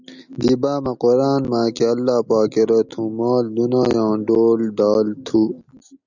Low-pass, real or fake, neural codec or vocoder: 7.2 kHz; real; none